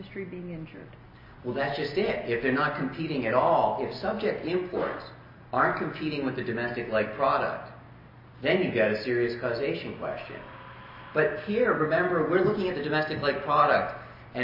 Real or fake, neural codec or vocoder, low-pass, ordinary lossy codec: real; none; 5.4 kHz; MP3, 24 kbps